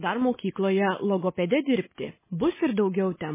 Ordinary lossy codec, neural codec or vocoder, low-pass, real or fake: MP3, 16 kbps; none; 3.6 kHz; real